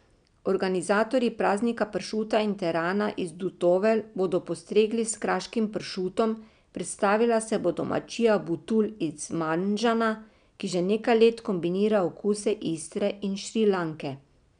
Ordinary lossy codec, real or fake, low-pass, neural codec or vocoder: none; real; 9.9 kHz; none